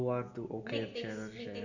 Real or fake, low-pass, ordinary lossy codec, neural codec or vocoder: real; 7.2 kHz; none; none